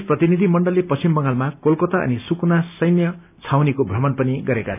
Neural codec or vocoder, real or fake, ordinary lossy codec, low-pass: none; real; none; 3.6 kHz